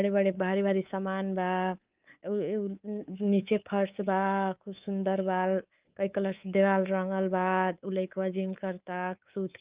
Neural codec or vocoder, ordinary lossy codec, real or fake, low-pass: codec, 24 kHz, 3.1 kbps, DualCodec; Opus, 32 kbps; fake; 3.6 kHz